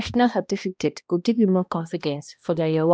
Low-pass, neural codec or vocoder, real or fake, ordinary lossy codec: none; codec, 16 kHz, 1 kbps, X-Codec, HuBERT features, trained on balanced general audio; fake; none